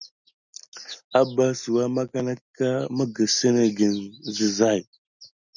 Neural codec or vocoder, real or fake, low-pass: none; real; 7.2 kHz